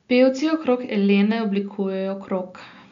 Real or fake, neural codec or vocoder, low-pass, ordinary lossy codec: real; none; 7.2 kHz; none